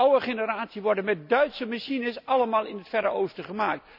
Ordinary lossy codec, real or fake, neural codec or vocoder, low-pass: none; real; none; 5.4 kHz